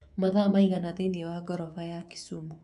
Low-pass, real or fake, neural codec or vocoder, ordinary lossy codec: 10.8 kHz; fake; codec, 24 kHz, 3.1 kbps, DualCodec; MP3, 96 kbps